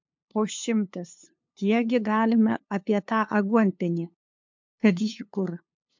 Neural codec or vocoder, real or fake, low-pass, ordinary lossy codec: codec, 16 kHz, 2 kbps, FunCodec, trained on LibriTTS, 25 frames a second; fake; 7.2 kHz; MP3, 64 kbps